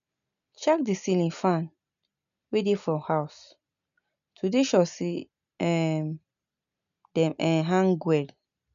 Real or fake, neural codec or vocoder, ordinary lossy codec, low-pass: real; none; none; 7.2 kHz